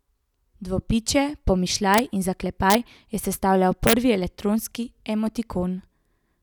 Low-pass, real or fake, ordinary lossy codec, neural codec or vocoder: 19.8 kHz; real; none; none